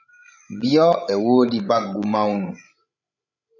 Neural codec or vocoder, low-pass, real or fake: codec, 16 kHz, 16 kbps, FreqCodec, larger model; 7.2 kHz; fake